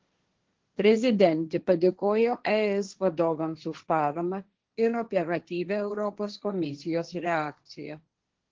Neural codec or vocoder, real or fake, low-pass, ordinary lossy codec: codec, 16 kHz, 1.1 kbps, Voila-Tokenizer; fake; 7.2 kHz; Opus, 16 kbps